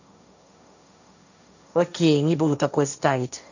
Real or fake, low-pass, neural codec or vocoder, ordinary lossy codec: fake; 7.2 kHz; codec, 16 kHz, 1.1 kbps, Voila-Tokenizer; none